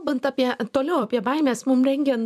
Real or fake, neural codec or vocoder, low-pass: fake; vocoder, 44.1 kHz, 128 mel bands every 256 samples, BigVGAN v2; 14.4 kHz